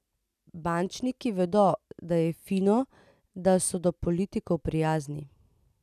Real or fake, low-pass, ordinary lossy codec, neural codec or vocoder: real; 14.4 kHz; none; none